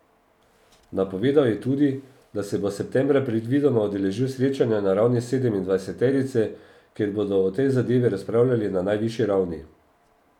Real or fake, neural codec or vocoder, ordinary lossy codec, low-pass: real; none; none; 19.8 kHz